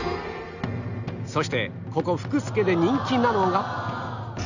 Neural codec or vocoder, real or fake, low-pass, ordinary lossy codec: none; real; 7.2 kHz; none